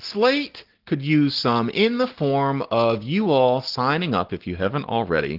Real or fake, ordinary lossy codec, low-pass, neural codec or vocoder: real; Opus, 16 kbps; 5.4 kHz; none